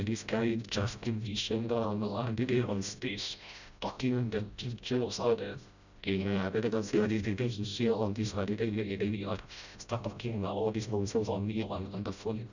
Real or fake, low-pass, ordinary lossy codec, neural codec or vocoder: fake; 7.2 kHz; none; codec, 16 kHz, 0.5 kbps, FreqCodec, smaller model